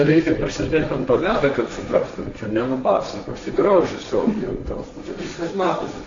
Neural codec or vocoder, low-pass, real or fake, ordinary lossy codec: codec, 16 kHz, 1.1 kbps, Voila-Tokenizer; 7.2 kHz; fake; AAC, 48 kbps